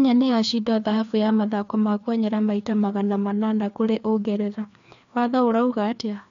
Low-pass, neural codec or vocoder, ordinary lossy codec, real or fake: 7.2 kHz; codec, 16 kHz, 2 kbps, FreqCodec, larger model; MP3, 64 kbps; fake